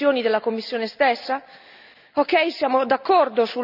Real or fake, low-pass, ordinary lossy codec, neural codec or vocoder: real; 5.4 kHz; none; none